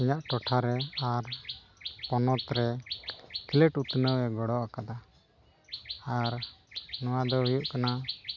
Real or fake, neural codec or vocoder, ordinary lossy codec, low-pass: real; none; none; 7.2 kHz